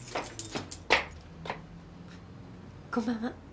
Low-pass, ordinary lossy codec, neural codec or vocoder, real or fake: none; none; none; real